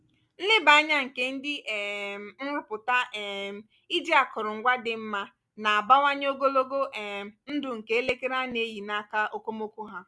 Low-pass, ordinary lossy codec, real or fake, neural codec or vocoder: none; none; real; none